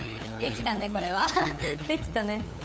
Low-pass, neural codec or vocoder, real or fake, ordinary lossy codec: none; codec, 16 kHz, 8 kbps, FunCodec, trained on LibriTTS, 25 frames a second; fake; none